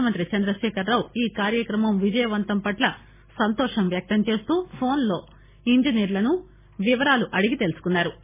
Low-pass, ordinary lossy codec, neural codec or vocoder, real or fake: 3.6 kHz; MP3, 16 kbps; none; real